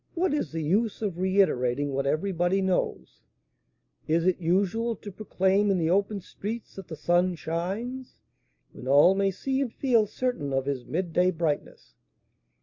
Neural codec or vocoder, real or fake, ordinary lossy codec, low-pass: none; real; MP3, 48 kbps; 7.2 kHz